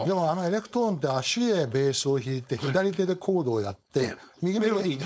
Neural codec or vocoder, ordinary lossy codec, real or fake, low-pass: codec, 16 kHz, 4.8 kbps, FACodec; none; fake; none